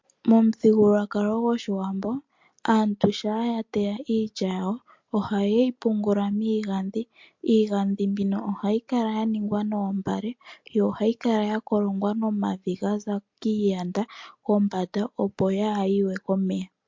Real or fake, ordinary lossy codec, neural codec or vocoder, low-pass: real; MP3, 48 kbps; none; 7.2 kHz